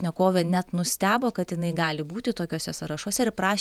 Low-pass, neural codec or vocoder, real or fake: 19.8 kHz; vocoder, 48 kHz, 128 mel bands, Vocos; fake